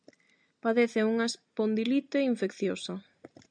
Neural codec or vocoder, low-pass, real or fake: none; 9.9 kHz; real